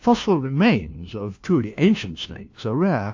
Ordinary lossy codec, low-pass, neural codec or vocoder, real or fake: AAC, 48 kbps; 7.2 kHz; codec, 16 kHz, 1 kbps, FunCodec, trained on LibriTTS, 50 frames a second; fake